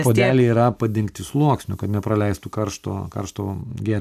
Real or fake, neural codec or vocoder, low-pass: real; none; 14.4 kHz